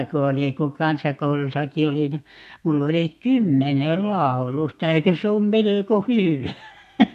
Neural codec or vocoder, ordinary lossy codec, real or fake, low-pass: codec, 32 kHz, 1.9 kbps, SNAC; MP3, 64 kbps; fake; 14.4 kHz